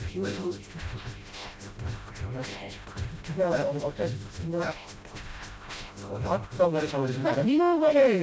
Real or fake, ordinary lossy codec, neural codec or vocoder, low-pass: fake; none; codec, 16 kHz, 0.5 kbps, FreqCodec, smaller model; none